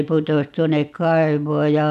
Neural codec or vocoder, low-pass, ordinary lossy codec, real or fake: none; 14.4 kHz; none; real